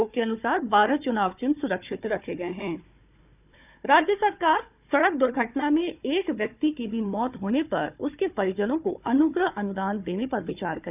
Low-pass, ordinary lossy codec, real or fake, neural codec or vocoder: 3.6 kHz; none; fake; codec, 16 kHz, 4 kbps, FunCodec, trained on Chinese and English, 50 frames a second